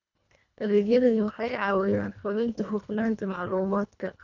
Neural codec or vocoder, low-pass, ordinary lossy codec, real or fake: codec, 24 kHz, 1.5 kbps, HILCodec; 7.2 kHz; none; fake